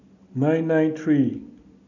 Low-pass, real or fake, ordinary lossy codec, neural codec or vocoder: 7.2 kHz; real; none; none